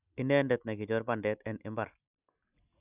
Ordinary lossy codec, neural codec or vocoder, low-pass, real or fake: none; none; 3.6 kHz; real